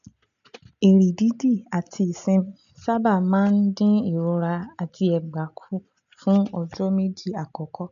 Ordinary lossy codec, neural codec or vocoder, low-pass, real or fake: none; none; 7.2 kHz; real